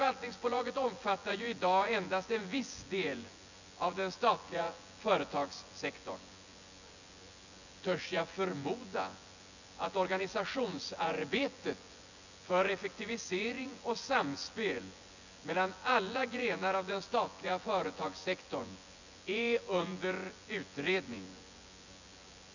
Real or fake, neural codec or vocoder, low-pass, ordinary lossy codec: fake; vocoder, 24 kHz, 100 mel bands, Vocos; 7.2 kHz; none